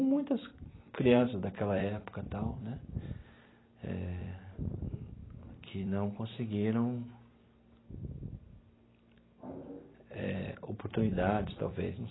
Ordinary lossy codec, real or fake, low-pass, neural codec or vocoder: AAC, 16 kbps; fake; 7.2 kHz; vocoder, 44.1 kHz, 128 mel bands every 256 samples, BigVGAN v2